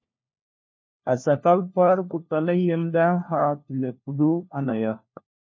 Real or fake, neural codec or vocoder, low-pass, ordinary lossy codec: fake; codec, 16 kHz, 1 kbps, FunCodec, trained on LibriTTS, 50 frames a second; 7.2 kHz; MP3, 32 kbps